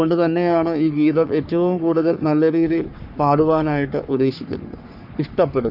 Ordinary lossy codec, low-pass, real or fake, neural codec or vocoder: none; 5.4 kHz; fake; codec, 44.1 kHz, 3.4 kbps, Pupu-Codec